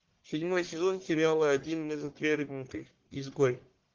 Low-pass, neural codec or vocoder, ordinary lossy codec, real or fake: 7.2 kHz; codec, 44.1 kHz, 1.7 kbps, Pupu-Codec; Opus, 16 kbps; fake